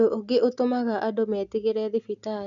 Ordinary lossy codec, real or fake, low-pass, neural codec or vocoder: none; real; 7.2 kHz; none